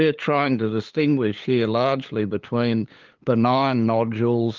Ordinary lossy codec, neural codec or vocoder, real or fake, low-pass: Opus, 32 kbps; codec, 16 kHz, 8 kbps, FunCodec, trained on LibriTTS, 25 frames a second; fake; 7.2 kHz